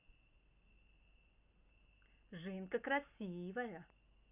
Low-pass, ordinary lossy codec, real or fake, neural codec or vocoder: 3.6 kHz; none; real; none